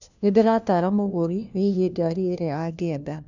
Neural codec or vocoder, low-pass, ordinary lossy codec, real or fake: codec, 16 kHz, 1 kbps, FunCodec, trained on LibriTTS, 50 frames a second; 7.2 kHz; none; fake